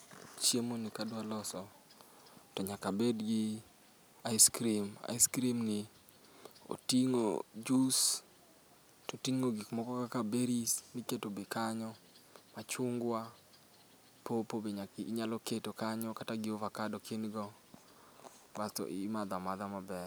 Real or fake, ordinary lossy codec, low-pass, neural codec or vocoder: real; none; none; none